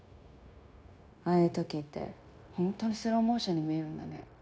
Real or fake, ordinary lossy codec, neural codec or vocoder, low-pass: fake; none; codec, 16 kHz, 0.9 kbps, LongCat-Audio-Codec; none